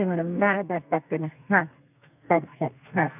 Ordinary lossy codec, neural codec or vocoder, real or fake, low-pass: none; codec, 32 kHz, 1.9 kbps, SNAC; fake; 3.6 kHz